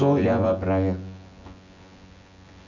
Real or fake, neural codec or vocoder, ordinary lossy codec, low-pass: fake; vocoder, 24 kHz, 100 mel bands, Vocos; none; 7.2 kHz